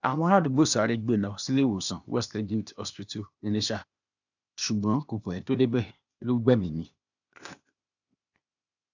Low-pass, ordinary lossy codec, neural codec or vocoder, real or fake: 7.2 kHz; none; codec, 16 kHz, 0.8 kbps, ZipCodec; fake